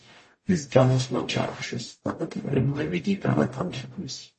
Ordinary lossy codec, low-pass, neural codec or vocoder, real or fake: MP3, 32 kbps; 10.8 kHz; codec, 44.1 kHz, 0.9 kbps, DAC; fake